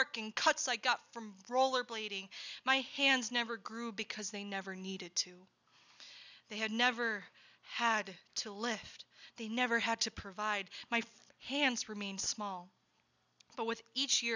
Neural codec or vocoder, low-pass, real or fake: none; 7.2 kHz; real